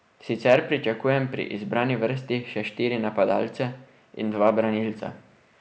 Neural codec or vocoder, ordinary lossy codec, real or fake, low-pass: none; none; real; none